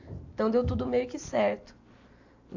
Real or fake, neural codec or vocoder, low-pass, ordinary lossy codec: real; none; 7.2 kHz; AAC, 48 kbps